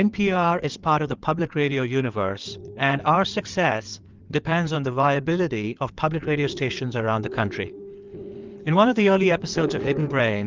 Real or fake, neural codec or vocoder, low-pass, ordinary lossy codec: fake; codec, 16 kHz in and 24 kHz out, 2.2 kbps, FireRedTTS-2 codec; 7.2 kHz; Opus, 24 kbps